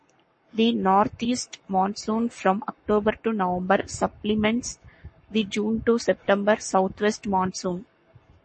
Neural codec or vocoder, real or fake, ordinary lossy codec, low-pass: codec, 44.1 kHz, 7.8 kbps, Pupu-Codec; fake; MP3, 32 kbps; 10.8 kHz